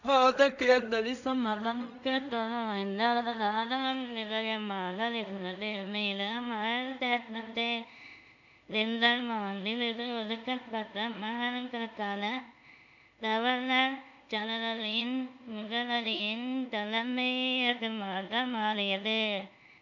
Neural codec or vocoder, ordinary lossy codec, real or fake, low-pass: codec, 16 kHz in and 24 kHz out, 0.4 kbps, LongCat-Audio-Codec, two codebook decoder; none; fake; 7.2 kHz